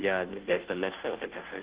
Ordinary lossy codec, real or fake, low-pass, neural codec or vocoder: Opus, 16 kbps; fake; 3.6 kHz; codec, 16 kHz, 0.5 kbps, FunCodec, trained on Chinese and English, 25 frames a second